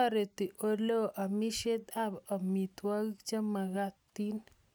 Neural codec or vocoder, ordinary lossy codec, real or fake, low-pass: none; none; real; none